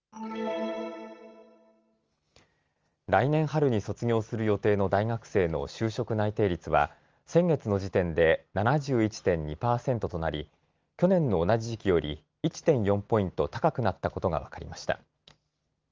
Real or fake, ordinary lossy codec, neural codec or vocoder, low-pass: real; Opus, 32 kbps; none; 7.2 kHz